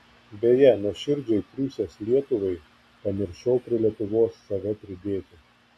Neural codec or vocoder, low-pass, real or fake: none; 14.4 kHz; real